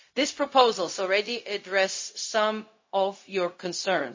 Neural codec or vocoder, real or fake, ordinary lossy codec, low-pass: codec, 16 kHz, 0.4 kbps, LongCat-Audio-Codec; fake; MP3, 32 kbps; 7.2 kHz